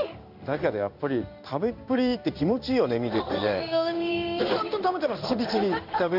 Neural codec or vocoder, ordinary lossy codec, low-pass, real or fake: codec, 16 kHz in and 24 kHz out, 1 kbps, XY-Tokenizer; none; 5.4 kHz; fake